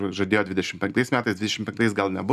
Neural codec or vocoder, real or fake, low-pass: none; real; 14.4 kHz